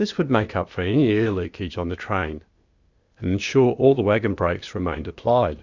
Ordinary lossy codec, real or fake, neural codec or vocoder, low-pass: Opus, 64 kbps; fake; codec, 16 kHz, 0.8 kbps, ZipCodec; 7.2 kHz